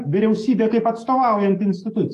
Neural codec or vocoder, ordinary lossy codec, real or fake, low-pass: codec, 44.1 kHz, 7.8 kbps, DAC; AAC, 64 kbps; fake; 14.4 kHz